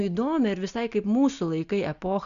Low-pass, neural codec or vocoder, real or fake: 7.2 kHz; none; real